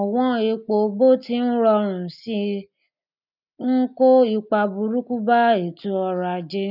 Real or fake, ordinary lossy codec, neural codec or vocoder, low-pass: real; none; none; 5.4 kHz